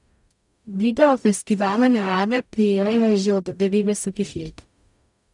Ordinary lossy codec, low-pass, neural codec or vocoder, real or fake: none; 10.8 kHz; codec, 44.1 kHz, 0.9 kbps, DAC; fake